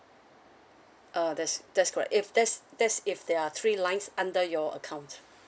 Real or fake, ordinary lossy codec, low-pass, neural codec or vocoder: real; none; none; none